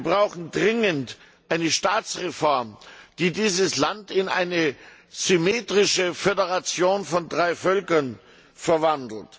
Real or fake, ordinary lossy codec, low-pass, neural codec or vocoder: real; none; none; none